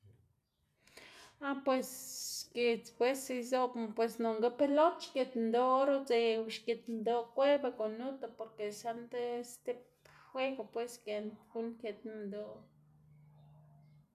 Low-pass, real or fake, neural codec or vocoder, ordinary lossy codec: 14.4 kHz; real; none; none